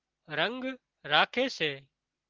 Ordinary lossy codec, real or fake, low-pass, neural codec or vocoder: Opus, 16 kbps; real; 7.2 kHz; none